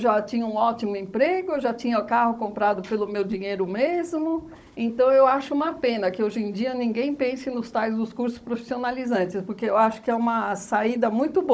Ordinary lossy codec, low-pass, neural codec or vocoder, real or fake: none; none; codec, 16 kHz, 16 kbps, FunCodec, trained on Chinese and English, 50 frames a second; fake